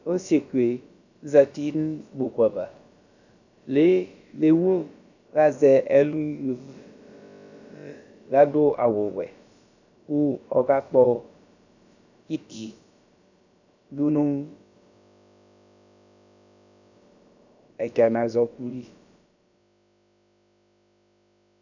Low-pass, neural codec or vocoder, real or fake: 7.2 kHz; codec, 16 kHz, about 1 kbps, DyCAST, with the encoder's durations; fake